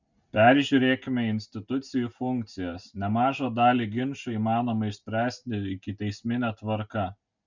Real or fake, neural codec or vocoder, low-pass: real; none; 7.2 kHz